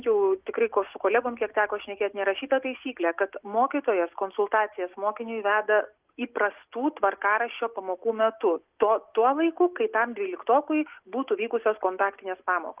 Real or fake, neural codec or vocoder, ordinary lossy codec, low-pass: real; none; Opus, 32 kbps; 3.6 kHz